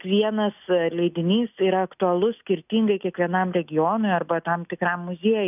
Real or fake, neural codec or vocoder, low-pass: real; none; 3.6 kHz